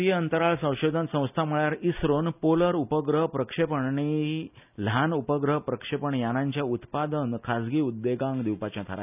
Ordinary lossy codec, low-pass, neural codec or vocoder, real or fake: none; 3.6 kHz; none; real